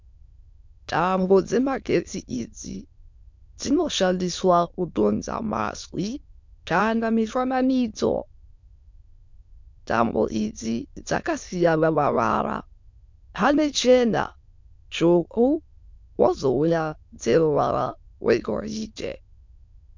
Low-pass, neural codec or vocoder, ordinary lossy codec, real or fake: 7.2 kHz; autoencoder, 22.05 kHz, a latent of 192 numbers a frame, VITS, trained on many speakers; MP3, 64 kbps; fake